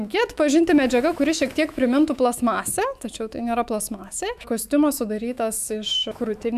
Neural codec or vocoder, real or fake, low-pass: autoencoder, 48 kHz, 128 numbers a frame, DAC-VAE, trained on Japanese speech; fake; 14.4 kHz